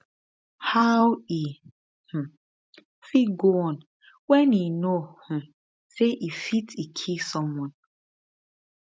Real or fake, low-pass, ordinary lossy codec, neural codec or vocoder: real; none; none; none